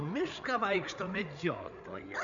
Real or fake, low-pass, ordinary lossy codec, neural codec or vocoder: fake; 7.2 kHz; MP3, 96 kbps; codec, 16 kHz, 8 kbps, FreqCodec, larger model